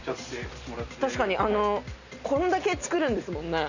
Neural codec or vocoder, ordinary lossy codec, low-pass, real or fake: none; none; 7.2 kHz; real